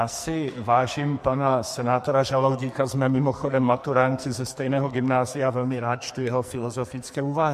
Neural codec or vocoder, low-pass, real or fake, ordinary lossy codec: codec, 44.1 kHz, 2.6 kbps, SNAC; 14.4 kHz; fake; MP3, 64 kbps